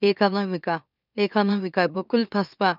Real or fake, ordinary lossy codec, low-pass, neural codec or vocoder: fake; none; 5.4 kHz; autoencoder, 44.1 kHz, a latent of 192 numbers a frame, MeloTTS